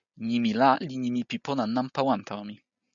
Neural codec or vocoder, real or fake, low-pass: none; real; 7.2 kHz